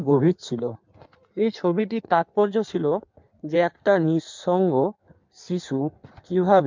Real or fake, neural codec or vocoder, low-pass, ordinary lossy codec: fake; codec, 16 kHz in and 24 kHz out, 1.1 kbps, FireRedTTS-2 codec; 7.2 kHz; MP3, 64 kbps